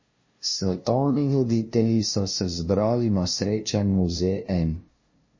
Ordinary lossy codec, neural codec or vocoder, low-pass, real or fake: MP3, 32 kbps; codec, 16 kHz, 0.5 kbps, FunCodec, trained on LibriTTS, 25 frames a second; 7.2 kHz; fake